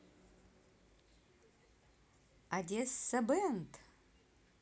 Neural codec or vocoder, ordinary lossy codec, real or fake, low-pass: none; none; real; none